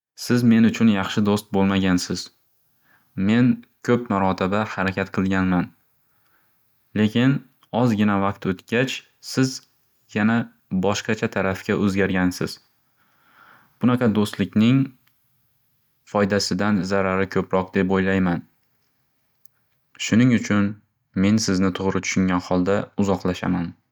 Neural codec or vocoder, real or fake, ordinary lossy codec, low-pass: none; real; none; 19.8 kHz